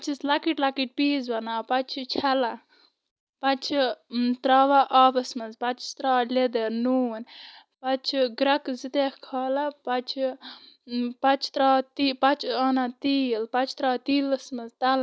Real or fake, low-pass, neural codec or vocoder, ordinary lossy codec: real; none; none; none